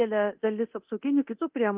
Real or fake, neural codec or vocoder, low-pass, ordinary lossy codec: fake; codec, 24 kHz, 0.9 kbps, DualCodec; 3.6 kHz; Opus, 32 kbps